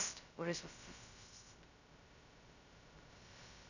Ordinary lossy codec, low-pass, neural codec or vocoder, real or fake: none; 7.2 kHz; codec, 16 kHz, 0.2 kbps, FocalCodec; fake